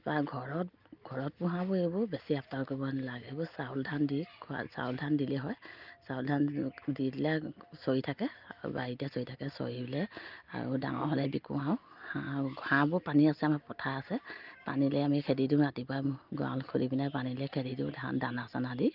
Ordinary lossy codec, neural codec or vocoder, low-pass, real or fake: Opus, 24 kbps; none; 5.4 kHz; real